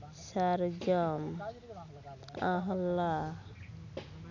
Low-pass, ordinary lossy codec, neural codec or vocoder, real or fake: 7.2 kHz; none; none; real